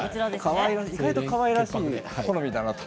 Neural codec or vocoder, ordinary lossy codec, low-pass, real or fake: none; none; none; real